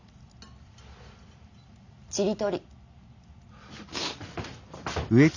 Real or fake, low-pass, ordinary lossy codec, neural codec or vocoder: real; 7.2 kHz; none; none